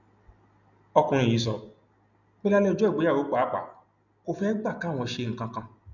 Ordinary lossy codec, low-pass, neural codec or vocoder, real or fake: none; 7.2 kHz; none; real